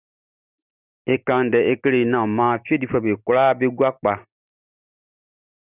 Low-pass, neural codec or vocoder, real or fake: 3.6 kHz; none; real